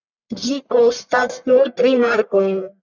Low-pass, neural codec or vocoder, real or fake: 7.2 kHz; codec, 44.1 kHz, 1.7 kbps, Pupu-Codec; fake